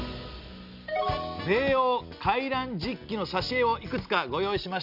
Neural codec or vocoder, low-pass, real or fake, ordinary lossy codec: none; 5.4 kHz; real; none